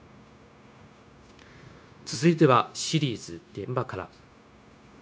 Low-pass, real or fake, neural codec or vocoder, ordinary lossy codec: none; fake; codec, 16 kHz, 0.9 kbps, LongCat-Audio-Codec; none